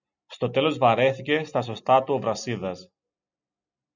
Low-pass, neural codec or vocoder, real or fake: 7.2 kHz; none; real